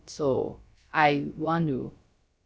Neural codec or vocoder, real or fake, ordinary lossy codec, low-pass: codec, 16 kHz, about 1 kbps, DyCAST, with the encoder's durations; fake; none; none